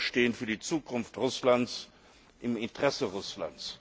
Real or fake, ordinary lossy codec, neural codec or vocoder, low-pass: real; none; none; none